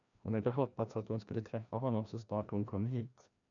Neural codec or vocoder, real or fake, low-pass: codec, 16 kHz, 1 kbps, FreqCodec, larger model; fake; 7.2 kHz